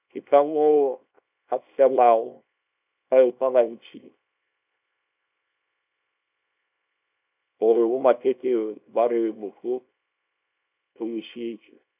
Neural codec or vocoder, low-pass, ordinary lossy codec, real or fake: codec, 24 kHz, 0.9 kbps, WavTokenizer, small release; 3.6 kHz; none; fake